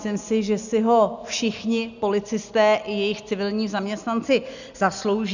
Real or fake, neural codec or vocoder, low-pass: real; none; 7.2 kHz